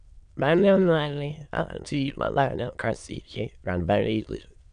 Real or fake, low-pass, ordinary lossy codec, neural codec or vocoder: fake; 9.9 kHz; none; autoencoder, 22.05 kHz, a latent of 192 numbers a frame, VITS, trained on many speakers